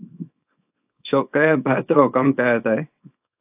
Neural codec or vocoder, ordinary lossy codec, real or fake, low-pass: codec, 24 kHz, 0.9 kbps, WavTokenizer, small release; AAC, 32 kbps; fake; 3.6 kHz